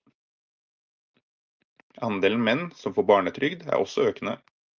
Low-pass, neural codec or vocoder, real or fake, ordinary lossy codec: 7.2 kHz; none; real; Opus, 24 kbps